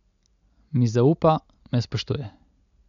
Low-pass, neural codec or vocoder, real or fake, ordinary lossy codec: 7.2 kHz; none; real; none